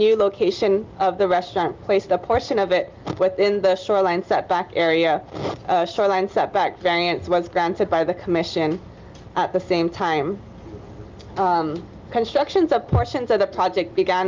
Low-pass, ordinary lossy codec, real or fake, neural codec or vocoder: 7.2 kHz; Opus, 32 kbps; real; none